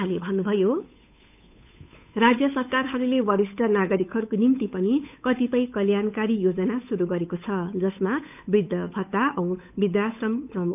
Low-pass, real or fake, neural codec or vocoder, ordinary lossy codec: 3.6 kHz; fake; codec, 16 kHz, 8 kbps, FunCodec, trained on Chinese and English, 25 frames a second; none